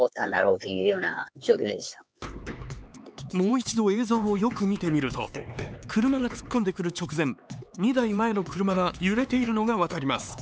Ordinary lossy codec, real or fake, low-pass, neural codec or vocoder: none; fake; none; codec, 16 kHz, 4 kbps, X-Codec, HuBERT features, trained on LibriSpeech